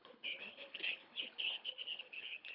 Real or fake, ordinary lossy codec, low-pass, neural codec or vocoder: fake; none; 5.4 kHz; codec, 24 kHz, 3 kbps, HILCodec